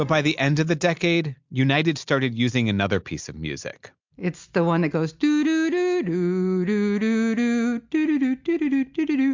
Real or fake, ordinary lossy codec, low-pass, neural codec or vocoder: real; MP3, 64 kbps; 7.2 kHz; none